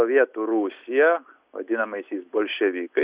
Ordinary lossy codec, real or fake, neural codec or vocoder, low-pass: Opus, 64 kbps; real; none; 3.6 kHz